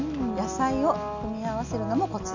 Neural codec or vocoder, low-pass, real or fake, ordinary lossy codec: none; 7.2 kHz; real; none